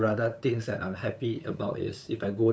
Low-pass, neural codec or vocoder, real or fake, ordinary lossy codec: none; codec, 16 kHz, 8 kbps, FunCodec, trained on LibriTTS, 25 frames a second; fake; none